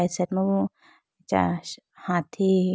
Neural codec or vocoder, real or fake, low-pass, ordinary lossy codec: none; real; none; none